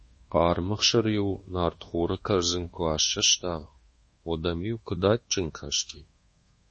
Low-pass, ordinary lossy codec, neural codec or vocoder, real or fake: 10.8 kHz; MP3, 32 kbps; codec, 24 kHz, 1.2 kbps, DualCodec; fake